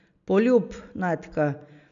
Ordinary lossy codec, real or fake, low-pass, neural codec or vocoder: none; real; 7.2 kHz; none